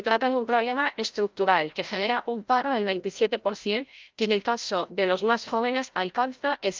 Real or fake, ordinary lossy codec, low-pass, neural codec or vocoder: fake; Opus, 24 kbps; 7.2 kHz; codec, 16 kHz, 0.5 kbps, FreqCodec, larger model